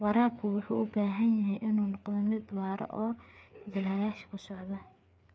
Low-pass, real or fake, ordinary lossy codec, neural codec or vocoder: none; fake; none; codec, 16 kHz, 4 kbps, FreqCodec, larger model